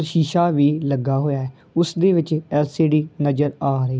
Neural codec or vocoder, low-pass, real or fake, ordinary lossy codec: none; none; real; none